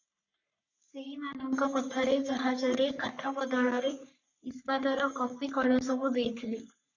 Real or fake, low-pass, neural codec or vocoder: fake; 7.2 kHz; codec, 44.1 kHz, 3.4 kbps, Pupu-Codec